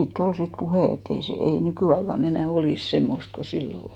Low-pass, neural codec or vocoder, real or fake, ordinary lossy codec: 19.8 kHz; autoencoder, 48 kHz, 128 numbers a frame, DAC-VAE, trained on Japanese speech; fake; Opus, 64 kbps